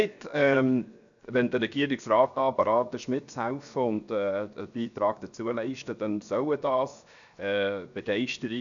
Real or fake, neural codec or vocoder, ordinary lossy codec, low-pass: fake; codec, 16 kHz, 0.7 kbps, FocalCodec; none; 7.2 kHz